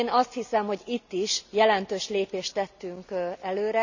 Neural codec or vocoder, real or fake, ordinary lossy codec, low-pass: none; real; none; 7.2 kHz